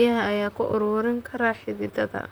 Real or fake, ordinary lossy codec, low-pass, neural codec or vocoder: fake; none; none; vocoder, 44.1 kHz, 128 mel bands, Pupu-Vocoder